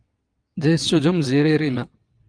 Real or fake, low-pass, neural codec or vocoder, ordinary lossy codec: fake; 9.9 kHz; vocoder, 22.05 kHz, 80 mel bands, WaveNeXt; Opus, 32 kbps